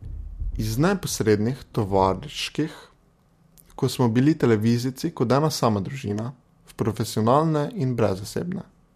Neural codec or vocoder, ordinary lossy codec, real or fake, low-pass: none; MP3, 64 kbps; real; 14.4 kHz